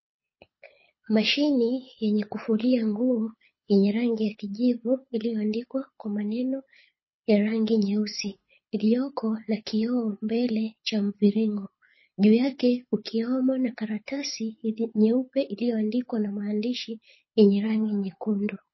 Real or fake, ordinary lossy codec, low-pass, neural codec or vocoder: fake; MP3, 24 kbps; 7.2 kHz; codec, 24 kHz, 6 kbps, HILCodec